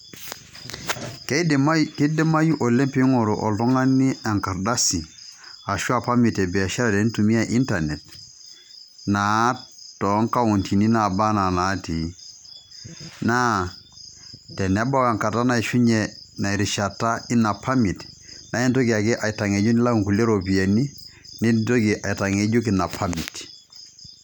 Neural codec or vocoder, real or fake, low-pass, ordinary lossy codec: none; real; 19.8 kHz; none